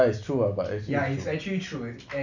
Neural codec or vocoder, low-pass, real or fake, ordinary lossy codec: none; 7.2 kHz; real; none